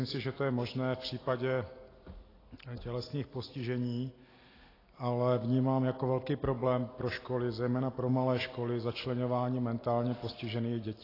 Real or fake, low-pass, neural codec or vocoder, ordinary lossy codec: real; 5.4 kHz; none; AAC, 24 kbps